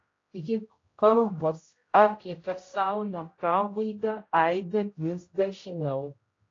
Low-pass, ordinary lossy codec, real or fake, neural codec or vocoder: 7.2 kHz; AAC, 32 kbps; fake; codec, 16 kHz, 0.5 kbps, X-Codec, HuBERT features, trained on general audio